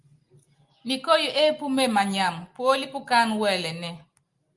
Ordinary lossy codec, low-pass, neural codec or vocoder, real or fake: Opus, 32 kbps; 10.8 kHz; none; real